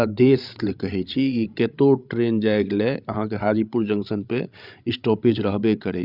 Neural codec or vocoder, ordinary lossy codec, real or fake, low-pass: codec, 16 kHz, 16 kbps, FreqCodec, larger model; Opus, 64 kbps; fake; 5.4 kHz